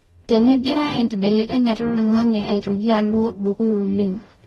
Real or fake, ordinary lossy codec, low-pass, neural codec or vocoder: fake; AAC, 32 kbps; 19.8 kHz; codec, 44.1 kHz, 0.9 kbps, DAC